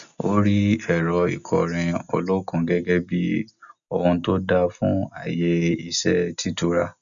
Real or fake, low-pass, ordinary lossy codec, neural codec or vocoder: real; 7.2 kHz; none; none